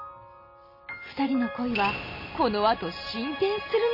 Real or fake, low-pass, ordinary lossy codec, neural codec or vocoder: real; 5.4 kHz; MP3, 24 kbps; none